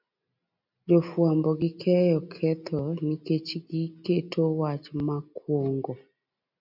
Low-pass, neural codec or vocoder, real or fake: 5.4 kHz; none; real